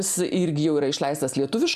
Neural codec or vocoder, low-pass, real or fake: none; 14.4 kHz; real